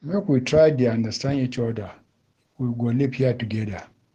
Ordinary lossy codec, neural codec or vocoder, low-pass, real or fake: Opus, 16 kbps; autoencoder, 48 kHz, 128 numbers a frame, DAC-VAE, trained on Japanese speech; 14.4 kHz; fake